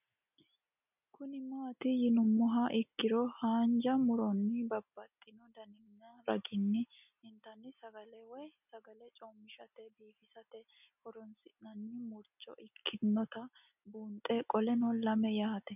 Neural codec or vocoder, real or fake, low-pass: none; real; 3.6 kHz